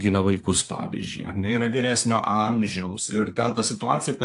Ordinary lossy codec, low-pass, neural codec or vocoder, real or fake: AAC, 64 kbps; 10.8 kHz; codec, 24 kHz, 1 kbps, SNAC; fake